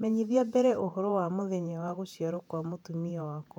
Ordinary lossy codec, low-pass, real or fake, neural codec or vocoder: none; 19.8 kHz; fake; vocoder, 48 kHz, 128 mel bands, Vocos